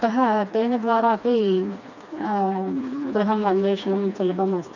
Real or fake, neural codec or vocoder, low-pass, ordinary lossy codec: fake; codec, 16 kHz, 2 kbps, FreqCodec, smaller model; 7.2 kHz; none